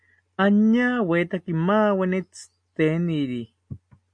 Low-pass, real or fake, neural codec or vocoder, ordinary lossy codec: 9.9 kHz; real; none; AAC, 64 kbps